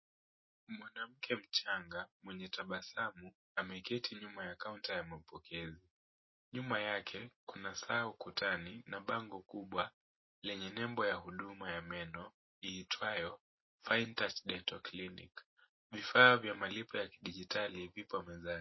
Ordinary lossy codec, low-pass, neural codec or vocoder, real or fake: MP3, 24 kbps; 7.2 kHz; none; real